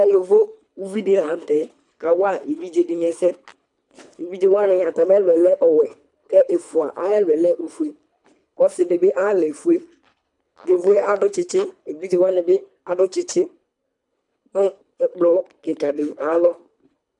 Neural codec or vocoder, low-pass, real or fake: codec, 24 kHz, 3 kbps, HILCodec; 10.8 kHz; fake